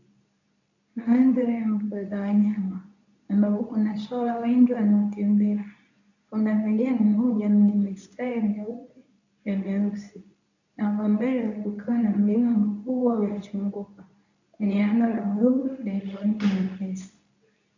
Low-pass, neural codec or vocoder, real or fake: 7.2 kHz; codec, 24 kHz, 0.9 kbps, WavTokenizer, medium speech release version 2; fake